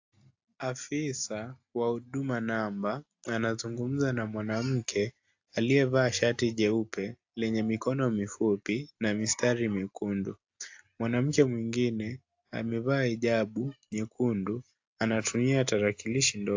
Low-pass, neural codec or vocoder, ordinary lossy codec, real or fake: 7.2 kHz; none; AAC, 48 kbps; real